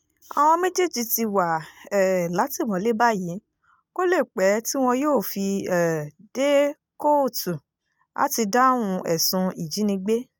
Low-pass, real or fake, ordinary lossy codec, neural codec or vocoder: none; real; none; none